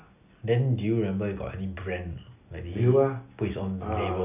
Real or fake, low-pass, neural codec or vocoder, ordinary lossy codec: real; 3.6 kHz; none; none